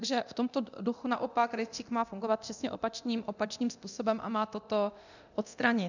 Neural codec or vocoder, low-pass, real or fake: codec, 24 kHz, 0.9 kbps, DualCodec; 7.2 kHz; fake